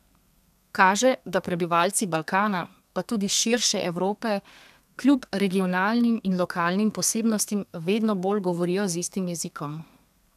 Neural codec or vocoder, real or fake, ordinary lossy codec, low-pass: codec, 32 kHz, 1.9 kbps, SNAC; fake; none; 14.4 kHz